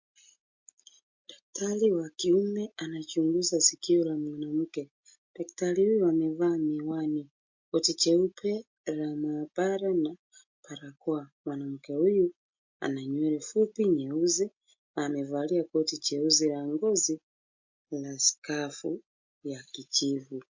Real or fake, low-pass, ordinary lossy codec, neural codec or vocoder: real; 7.2 kHz; MP3, 48 kbps; none